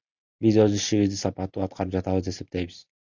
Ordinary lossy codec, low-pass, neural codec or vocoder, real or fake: Opus, 64 kbps; 7.2 kHz; none; real